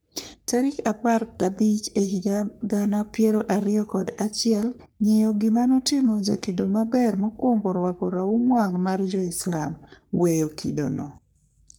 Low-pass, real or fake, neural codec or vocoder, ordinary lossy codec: none; fake; codec, 44.1 kHz, 3.4 kbps, Pupu-Codec; none